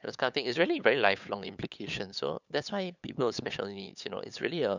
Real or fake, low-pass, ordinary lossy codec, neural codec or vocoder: fake; 7.2 kHz; none; codec, 16 kHz, 8 kbps, FunCodec, trained on LibriTTS, 25 frames a second